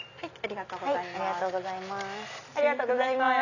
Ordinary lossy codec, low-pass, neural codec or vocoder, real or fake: none; 7.2 kHz; none; real